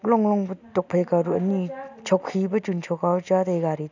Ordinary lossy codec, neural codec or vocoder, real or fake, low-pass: none; none; real; 7.2 kHz